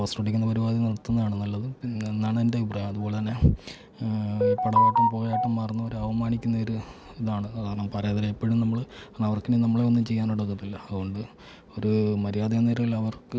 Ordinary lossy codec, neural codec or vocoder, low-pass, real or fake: none; none; none; real